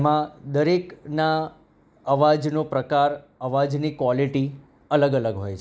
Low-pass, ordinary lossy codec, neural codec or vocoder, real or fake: none; none; none; real